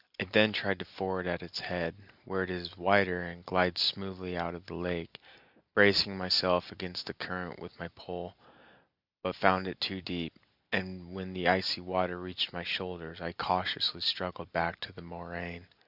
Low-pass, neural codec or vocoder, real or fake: 5.4 kHz; none; real